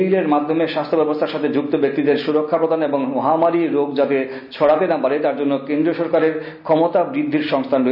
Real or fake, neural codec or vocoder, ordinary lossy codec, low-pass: real; none; none; 5.4 kHz